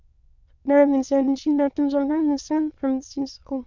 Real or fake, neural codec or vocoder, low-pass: fake; autoencoder, 22.05 kHz, a latent of 192 numbers a frame, VITS, trained on many speakers; 7.2 kHz